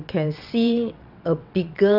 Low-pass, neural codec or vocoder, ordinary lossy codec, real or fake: 5.4 kHz; vocoder, 22.05 kHz, 80 mel bands, WaveNeXt; none; fake